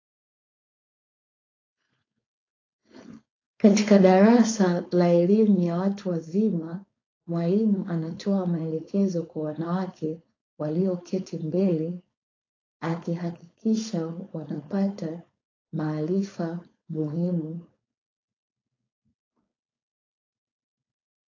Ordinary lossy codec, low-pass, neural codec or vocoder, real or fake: AAC, 32 kbps; 7.2 kHz; codec, 16 kHz, 4.8 kbps, FACodec; fake